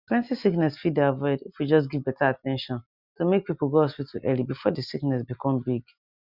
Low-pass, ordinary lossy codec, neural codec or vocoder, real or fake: 5.4 kHz; Opus, 64 kbps; none; real